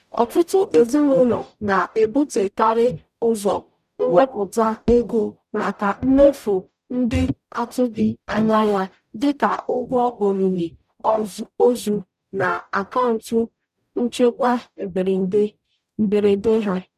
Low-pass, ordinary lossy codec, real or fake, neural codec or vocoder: 14.4 kHz; none; fake; codec, 44.1 kHz, 0.9 kbps, DAC